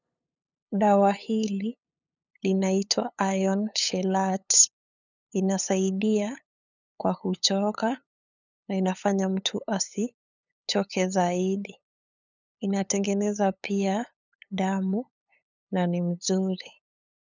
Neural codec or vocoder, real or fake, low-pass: codec, 16 kHz, 8 kbps, FunCodec, trained on LibriTTS, 25 frames a second; fake; 7.2 kHz